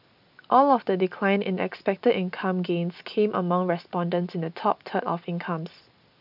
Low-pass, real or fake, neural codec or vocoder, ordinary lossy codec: 5.4 kHz; real; none; none